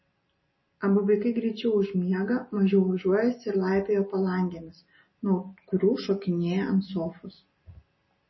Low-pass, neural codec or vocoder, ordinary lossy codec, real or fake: 7.2 kHz; none; MP3, 24 kbps; real